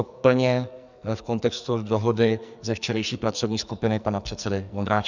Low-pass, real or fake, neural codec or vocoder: 7.2 kHz; fake; codec, 44.1 kHz, 2.6 kbps, SNAC